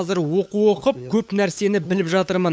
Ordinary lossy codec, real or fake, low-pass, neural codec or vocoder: none; fake; none; codec, 16 kHz, 8 kbps, FunCodec, trained on LibriTTS, 25 frames a second